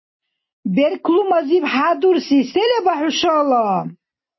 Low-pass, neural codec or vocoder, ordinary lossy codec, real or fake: 7.2 kHz; none; MP3, 24 kbps; real